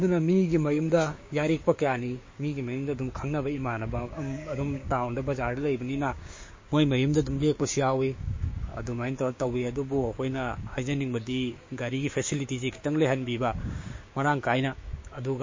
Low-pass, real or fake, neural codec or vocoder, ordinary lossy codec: 7.2 kHz; fake; codec, 16 kHz, 6 kbps, DAC; MP3, 32 kbps